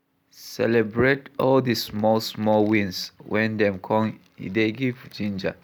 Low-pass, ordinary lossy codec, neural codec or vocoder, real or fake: none; none; none; real